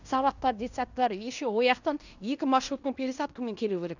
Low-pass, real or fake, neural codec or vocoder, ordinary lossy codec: 7.2 kHz; fake; codec, 16 kHz in and 24 kHz out, 0.9 kbps, LongCat-Audio-Codec, fine tuned four codebook decoder; none